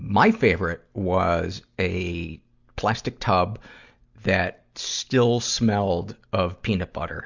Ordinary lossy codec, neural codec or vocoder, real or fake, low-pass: Opus, 64 kbps; none; real; 7.2 kHz